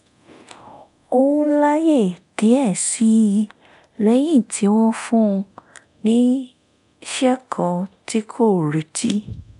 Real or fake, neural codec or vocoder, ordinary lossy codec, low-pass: fake; codec, 24 kHz, 0.9 kbps, DualCodec; none; 10.8 kHz